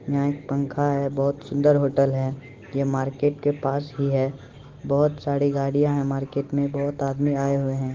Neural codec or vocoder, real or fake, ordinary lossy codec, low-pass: none; real; Opus, 16 kbps; 7.2 kHz